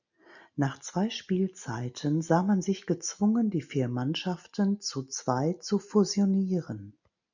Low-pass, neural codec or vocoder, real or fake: 7.2 kHz; none; real